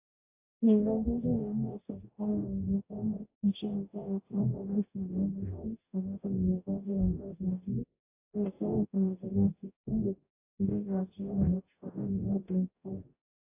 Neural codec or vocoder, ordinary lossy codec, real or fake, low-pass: codec, 44.1 kHz, 0.9 kbps, DAC; AAC, 24 kbps; fake; 3.6 kHz